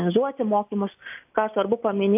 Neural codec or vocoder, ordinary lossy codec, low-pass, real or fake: none; AAC, 32 kbps; 3.6 kHz; real